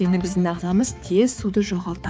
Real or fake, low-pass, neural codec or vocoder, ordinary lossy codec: fake; none; codec, 16 kHz, 4 kbps, X-Codec, HuBERT features, trained on balanced general audio; none